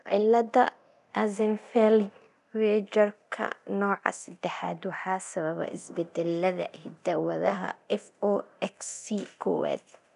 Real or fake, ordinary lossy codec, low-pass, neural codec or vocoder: fake; none; 10.8 kHz; codec, 24 kHz, 0.9 kbps, DualCodec